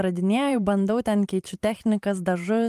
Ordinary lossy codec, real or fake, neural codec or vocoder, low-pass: Opus, 32 kbps; fake; vocoder, 44.1 kHz, 128 mel bands every 512 samples, BigVGAN v2; 14.4 kHz